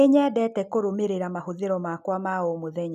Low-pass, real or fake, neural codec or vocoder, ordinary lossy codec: 14.4 kHz; real; none; none